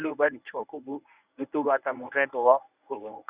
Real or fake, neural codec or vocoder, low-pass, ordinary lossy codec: fake; codec, 24 kHz, 0.9 kbps, WavTokenizer, medium speech release version 1; 3.6 kHz; Opus, 64 kbps